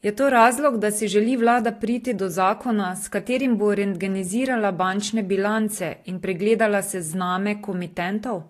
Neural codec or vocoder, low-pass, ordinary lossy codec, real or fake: none; 14.4 kHz; AAC, 48 kbps; real